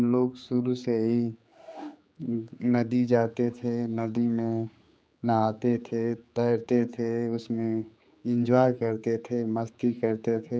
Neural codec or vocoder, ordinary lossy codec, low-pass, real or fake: codec, 16 kHz, 4 kbps, X-Codec, HuBERT features, trained on general audio; none; none; fake